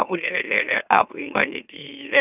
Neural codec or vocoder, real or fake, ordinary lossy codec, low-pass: autoencoder, 44.1 kHz, a latent of 192 numbers a frame, MeloTTS; fake; none; 3.6 kHz